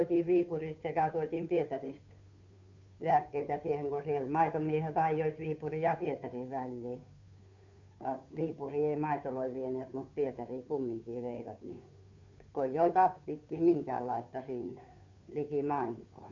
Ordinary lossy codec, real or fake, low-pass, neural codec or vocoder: none; fake; 7.2 kHz; codec, 16 kHz, 2 kbps, FunCodec, trained on Chinese and English, 25 frames a second